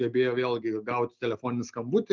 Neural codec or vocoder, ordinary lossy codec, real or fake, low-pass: none; Opus, 24 kbps; real; 7.2 kHz